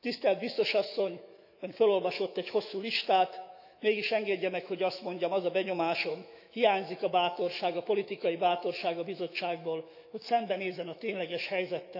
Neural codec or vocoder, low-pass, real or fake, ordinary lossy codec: autoencoder, 48 kHz, 128 numbers a frame, DAC-VAE, trained on Japanese speech; 5.4 kHz; fake; none